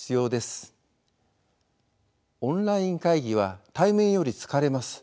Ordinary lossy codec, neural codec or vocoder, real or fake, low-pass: none; none; real; none